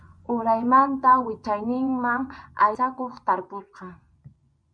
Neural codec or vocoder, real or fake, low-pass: vocoder, 24 kHz, 100 mel bands, Vocos; fake; 9.9 kHz